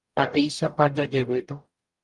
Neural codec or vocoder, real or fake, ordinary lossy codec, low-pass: codec, 44.1 kHz, 0.9 kbps, DAC; fake; Opus, 32 kbps; 10.8 kHz